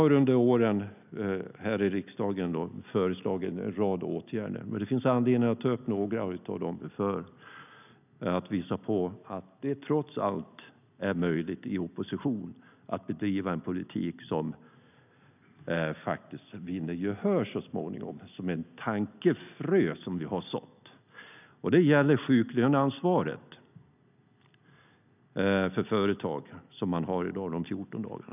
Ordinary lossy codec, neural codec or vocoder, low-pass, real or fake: none; none; 3.6 kHz; real